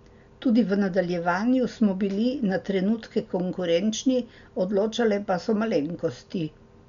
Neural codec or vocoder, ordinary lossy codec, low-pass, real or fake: none; none; 7.2 kHz; real